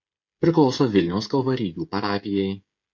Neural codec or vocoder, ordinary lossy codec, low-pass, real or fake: codec, 16 kHz, 16 kbps, FreqCodec, smaller model; MP3, 64 kbps; 7.2 kHz; fake